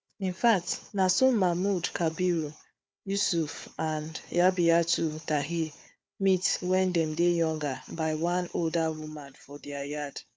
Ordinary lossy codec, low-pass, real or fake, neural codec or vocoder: none; none; fake; codec, 16 kHz, 4 kbps, FunCodec, trained on Chinese and English, 50 frames a second